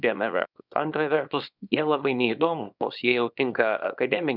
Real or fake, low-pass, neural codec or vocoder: fake; 5.4 kHz; codec, 24 kHz, 0.9 kbps, WavTokenizer, small release